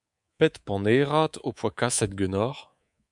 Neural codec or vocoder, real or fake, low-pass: codec, 24 kHz, 3.1 kbps, DualCodec; fake; 10.8 kHz